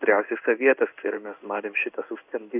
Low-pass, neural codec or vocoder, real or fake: 3.6 kHz; codec, 16 kHz in and 24 kHz out, 1 kbps, XY-Tokenizer; fake